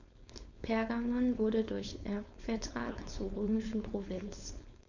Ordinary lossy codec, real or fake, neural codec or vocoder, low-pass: none; fake; codec, 16 kHz, 4.8 kbps, FACodec; 7.2 kHz